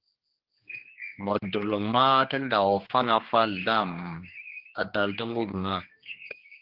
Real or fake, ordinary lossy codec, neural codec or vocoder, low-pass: fake; Opus, 16 kbps; codec, 16 kHz, 2 kbps, X-Codec, HuBERT features, trained on general audio; 5.4 kHz